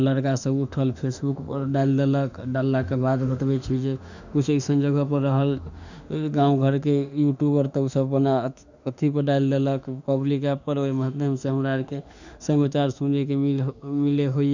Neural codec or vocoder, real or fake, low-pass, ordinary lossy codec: autoencoder, 48 kHz, 32 numbers a frame, DAC-VAE, trained on Japanese speech; fake; 7.2 kHz; none